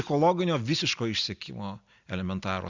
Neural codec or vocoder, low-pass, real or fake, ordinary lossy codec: none; 7.2 kHz; real; Opus, 64 kbps